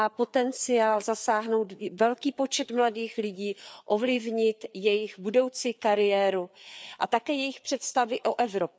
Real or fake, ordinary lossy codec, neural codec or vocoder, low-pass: fake; none; codec, 16 kHz, 4 kbps, FreqCodec, larger model; none